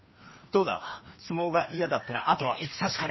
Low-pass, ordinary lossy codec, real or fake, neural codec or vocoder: 7.2 kHz; MP3, 24 kbps; fake; codec, 16 kHz, 2 kbps, FreqCodec, larger model